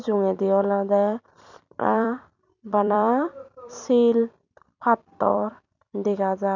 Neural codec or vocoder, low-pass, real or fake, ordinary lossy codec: vocoder, 44.1 kHz, 128 mel bands every 256 samples, BigVGAN v2; 7.2 kHz; fake; none